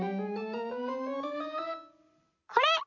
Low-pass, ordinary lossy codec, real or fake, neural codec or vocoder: 7.2 kHz; none; real; none